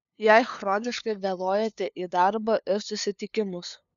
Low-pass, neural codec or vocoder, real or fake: 7.2 kHz; codec, 16 kHz, 2 kbps, FunCodec, trained on LibriTTS, 25 frames a second; fake